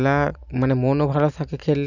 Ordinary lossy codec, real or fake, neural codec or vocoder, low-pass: none; real; none; 7.2 kHz